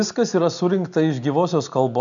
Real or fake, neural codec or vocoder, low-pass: real; none; 7.2 kHz